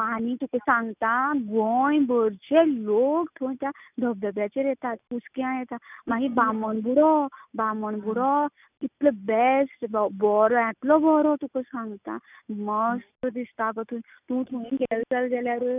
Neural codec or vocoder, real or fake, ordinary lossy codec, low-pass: none; real; none; 3.6 kHz